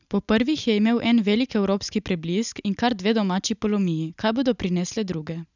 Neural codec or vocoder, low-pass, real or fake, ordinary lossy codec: none; 7.2 kHz; real; none